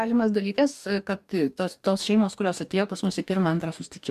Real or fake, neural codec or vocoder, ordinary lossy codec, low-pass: fake; codec, 44.1 kHz, 2.6 kbps, DAC; AAC, 96 kbps; 14.4 kHz